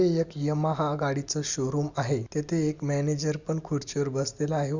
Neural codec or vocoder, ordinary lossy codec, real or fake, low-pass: none; Opus, 64 kbps; real; 7.2 kHz